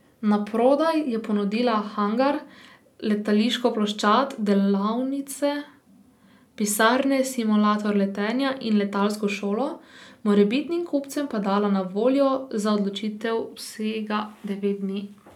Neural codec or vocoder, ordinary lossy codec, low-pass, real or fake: none; none; 19.8 kHz; real